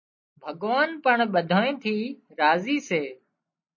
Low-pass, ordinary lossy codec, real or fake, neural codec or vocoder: 7.2 kHz; MP3, 32 kbps; real; none